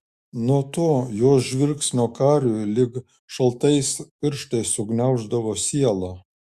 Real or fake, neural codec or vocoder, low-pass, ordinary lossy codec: fake; autoencoder, 48 kHz, 128 numbers a frame, DAC-VAE, trained on Japanese speech; 14.4 kHz; Opus, 64 kbps